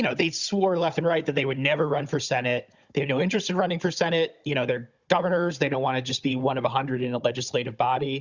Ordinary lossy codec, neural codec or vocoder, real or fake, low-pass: Opus, 64 kbps; codec, 16 kHz, 16 kbps, FunCodec, trained on Chinese and English, 50 frames a second; fake; 7.2 kHz